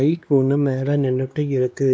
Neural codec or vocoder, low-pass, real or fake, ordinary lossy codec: codec, 16 kHz, 2 kbps, X-Codec, WavLM features, trained on Multilingual LibriSpeech; none; fake; none